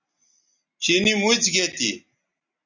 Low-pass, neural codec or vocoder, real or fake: 7.2 kHz; none; real